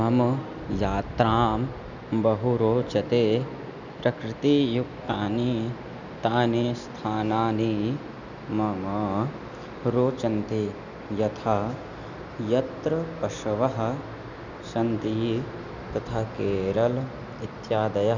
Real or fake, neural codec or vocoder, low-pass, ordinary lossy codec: real; none; 7.2 kHz; none